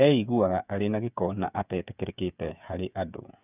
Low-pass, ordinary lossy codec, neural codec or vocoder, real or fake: 3.6 kHz; none; codec, 16 kHz, 8 kbps, FreqCodec, smaller model; fake